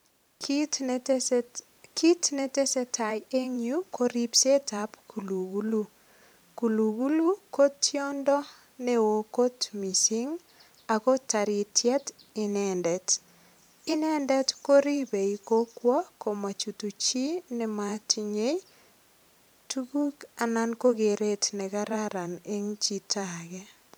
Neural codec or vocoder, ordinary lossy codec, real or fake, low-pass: vocoder, 44.1 kHz, 128 mel bands every 512 samples, BigVGAN v2; none; fake; none